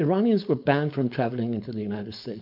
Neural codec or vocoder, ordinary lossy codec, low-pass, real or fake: codec, 16 kHz, 4.8 kbps, FACodec; MP3, 48 kbps; 5.4 kHz; fake